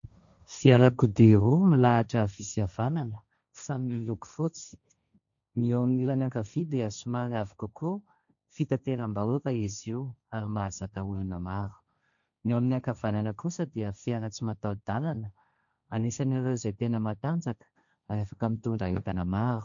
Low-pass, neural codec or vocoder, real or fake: 7.2 kHz; codec, 16 kHz, 1.1 kbps, Voila-Tokenizer; fake